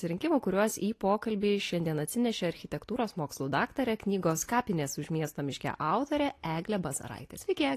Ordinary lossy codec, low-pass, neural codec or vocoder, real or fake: AAC, 48 kbps; 14.4 kHz; none; real